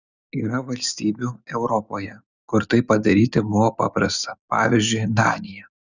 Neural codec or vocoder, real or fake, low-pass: none; real; 7.2 kHz